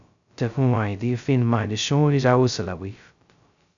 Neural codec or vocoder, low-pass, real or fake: codec, 16 kHz, 0.2 kbps, FocalCodec; 7.2 kHz; fake